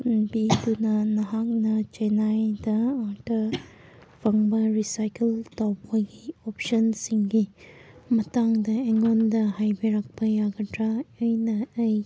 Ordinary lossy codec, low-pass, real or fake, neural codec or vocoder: none; none; real; none